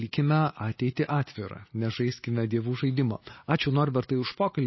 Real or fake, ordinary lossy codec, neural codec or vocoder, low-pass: real; MP3, 24 kbps; none; 7.2 kHz